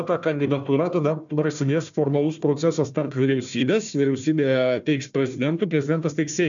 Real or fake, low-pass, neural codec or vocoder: fake; 7.2 kHz; codec, 16 kHz, 1 kbps, FunCodec, trained on Chinese and English, 50 frames a second